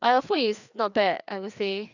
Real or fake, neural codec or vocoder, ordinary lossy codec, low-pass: fake; codec, 16 kHz, 2 kbps, FreqCodec, larger model; none; 7.2 kHz